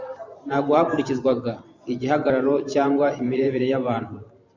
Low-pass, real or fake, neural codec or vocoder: 7.2 kHz; fake; vocoder, 44.1 kHz, 128 mel bands every 512 samples, BigVGAN v2